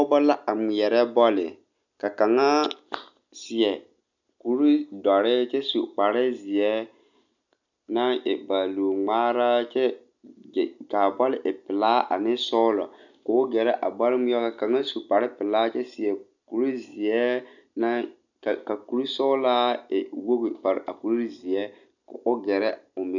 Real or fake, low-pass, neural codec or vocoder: real; 7.2 kHz; none